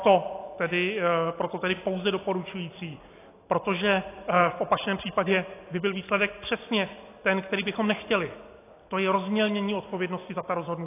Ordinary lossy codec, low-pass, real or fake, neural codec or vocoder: AAC, 24 kbps; 3.6 kHz; real; none